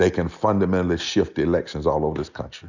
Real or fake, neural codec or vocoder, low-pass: real; none; 7.2 kHz